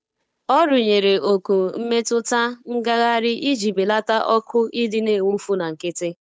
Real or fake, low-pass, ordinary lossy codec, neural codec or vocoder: fake; none; none; codec, 16 kHz, 8 kbps, FunCodec, trained on Chinese and English, 25 frames a second